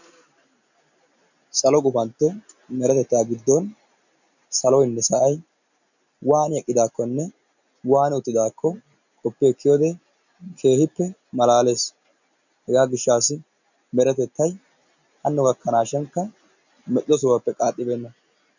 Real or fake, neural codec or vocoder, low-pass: real; none; 7.2 kHz